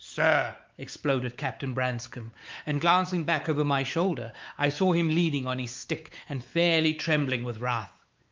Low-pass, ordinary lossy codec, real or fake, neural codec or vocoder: 7.2 kHz; Opus, 32 kbps; fake; codec, 16 kHz, 4 kbps, X-Codec, WavLM features, trained on Multilingual LibriSpeech